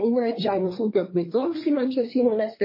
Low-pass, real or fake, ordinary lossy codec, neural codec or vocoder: 5.4 kHz; fake; MP3, 24 kbps; codec, 24 kHz, 1 kbps, SNAC